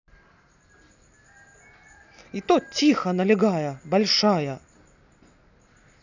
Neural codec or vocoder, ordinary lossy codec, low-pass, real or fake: none; none; 7.2 kHz; real